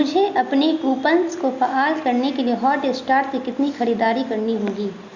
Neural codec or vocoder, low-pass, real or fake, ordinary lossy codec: none; 7.2 kHz; real; Opus, 64 kbps